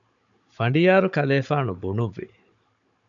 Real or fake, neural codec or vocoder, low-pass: fake; codec, 16 kHz, 16 kbps, FunCodec, trained on Chinese and English, 50 frames a second; 7.2 kHz